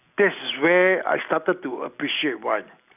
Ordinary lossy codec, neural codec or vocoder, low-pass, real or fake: none; none; 3.6 kHz; real